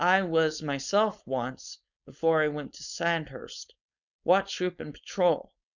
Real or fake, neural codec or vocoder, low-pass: fake; codec, 16 kHz, 4.8 kbps, FACodec; 7.2 kHz